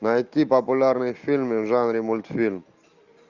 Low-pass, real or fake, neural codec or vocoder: 7.2 kHz; real; none